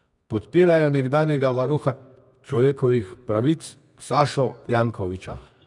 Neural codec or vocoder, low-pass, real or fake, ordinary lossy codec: codec, 24 kHz, 0.9 kbps, WavTokenizer, medium music audio release; 10.8 kHz; fake; none